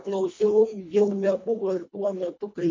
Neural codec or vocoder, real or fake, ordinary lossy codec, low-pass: codec, 24 kHz, 1.5 kbps, HILCodec; fake; MP3, 48 kbps; 7.2 kHz